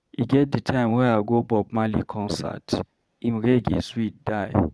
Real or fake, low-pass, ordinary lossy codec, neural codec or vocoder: fake; none; none; vocoder, 22.05 kHz, 80 mel bands, Vocos